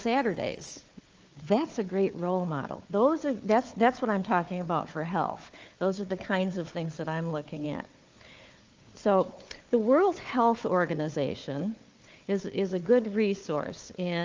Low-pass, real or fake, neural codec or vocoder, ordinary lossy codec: 7.2 kHz; fake; codec, 16 kHz, 4 kbps, FunCodec, trained on Chinese and English, 50 frames a second; Opus, 24 kbps